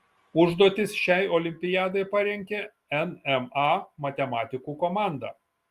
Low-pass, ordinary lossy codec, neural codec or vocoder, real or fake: 14.4 kHz; Opus, 32 kbps; none; real